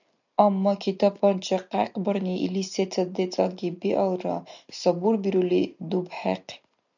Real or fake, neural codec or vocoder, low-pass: real; none; 7.2 kHz